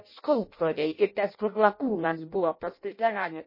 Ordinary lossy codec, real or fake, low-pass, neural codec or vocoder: MP3, 24 kbps; fake; 5.4 kHz; codec, 16 kHz in and 24 kHz out, 0.6 kbps, FireRedTTS-2 codec